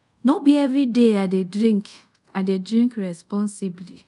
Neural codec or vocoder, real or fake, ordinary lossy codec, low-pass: codec, 24 kHz, 0.5 kbps, DualCodec; fake; none; 10.8 kHz